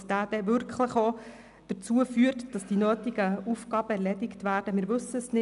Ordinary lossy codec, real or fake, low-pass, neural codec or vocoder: none; real; 10.8 kHz; none